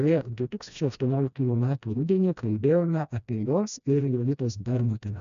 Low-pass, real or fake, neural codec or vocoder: 7.2 kHz; fake; codec, 16 kHz, 1 kbps, FreqCodec, smaller model